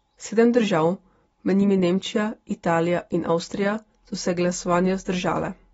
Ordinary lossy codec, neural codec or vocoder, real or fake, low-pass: AAC, 24 kbps; none; real; 19.8 kHz